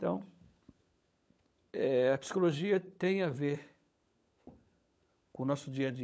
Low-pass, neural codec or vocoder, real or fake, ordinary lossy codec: none; codec, 16 kHz, 16 kbps, FunCodec, trained on LibriTTS, 50 frames a second; fake; none